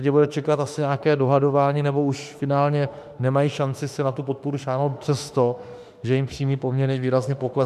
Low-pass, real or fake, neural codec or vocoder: 14.4 kHz; fake; autoencoder, 48 kHz, 32 numbers a frame, DAC-VAE, trained on Japanese speech